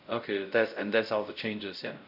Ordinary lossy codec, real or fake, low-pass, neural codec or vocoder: none; fake; 5.4 kHz; codec, 16 kHz, 0.5 kbps, X-Codec, WavLM features, trained on Multilingual LibriSpeech